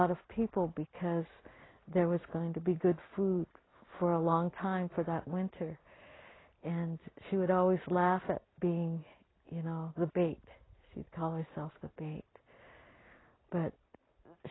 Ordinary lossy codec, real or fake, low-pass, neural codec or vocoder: AAC, 16 kbps; real; 7.2 kHz; none